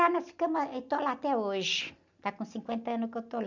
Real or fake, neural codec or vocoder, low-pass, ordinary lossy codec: real; none; 7.2 kHz; none